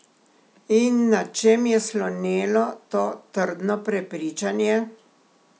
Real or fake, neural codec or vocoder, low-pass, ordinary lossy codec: real; none; none; none